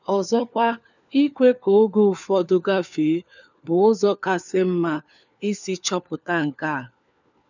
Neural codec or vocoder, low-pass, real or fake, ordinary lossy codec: codec, 16 kHz, 4 kbps, FunCodec, trained on LibriTTS, 50 frames a second; 7.2 kHz; fake; none